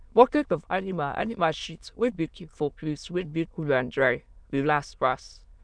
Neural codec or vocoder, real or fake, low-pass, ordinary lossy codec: autoencoder, 22.05 kHz, a latent of 192 numbers a frame, VITS, trained on many speakers; fake; 9.9 kHz; none